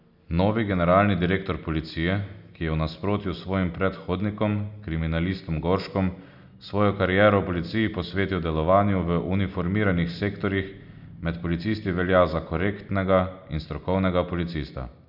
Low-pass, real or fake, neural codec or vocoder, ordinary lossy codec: 5.4 kHz; real; none; none